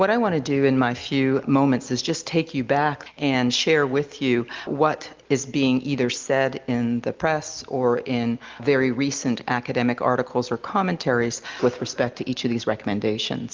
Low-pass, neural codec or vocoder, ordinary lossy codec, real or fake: 7.2 kHz; none; Opus, 24 kbps; real